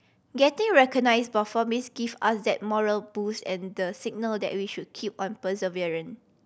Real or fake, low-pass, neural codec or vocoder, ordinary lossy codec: real; none; none; none